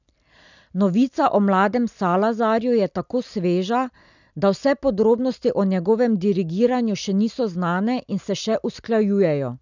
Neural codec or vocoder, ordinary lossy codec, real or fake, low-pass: none; none; real; 7.2 kHz